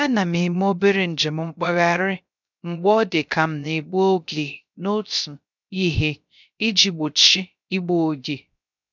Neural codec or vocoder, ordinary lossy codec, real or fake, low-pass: codec, 16 kHz, 0.3 kbps, FocalCodec; none; fake; 7.2 kHz